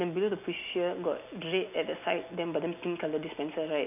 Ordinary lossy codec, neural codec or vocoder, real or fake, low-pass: none; none; real; 3.6 kHz